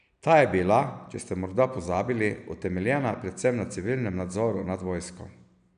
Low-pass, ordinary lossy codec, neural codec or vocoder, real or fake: 9.9 kHz; none; none; real